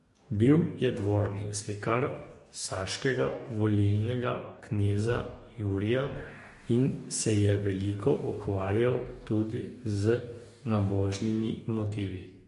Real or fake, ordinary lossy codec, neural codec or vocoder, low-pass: fake; MP3, 48 kbps; codec, 44.1 kHz, 2.6 kbps, DAC; 14.4 kHz